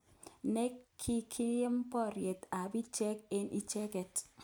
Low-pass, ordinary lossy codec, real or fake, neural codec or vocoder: none; none; real; none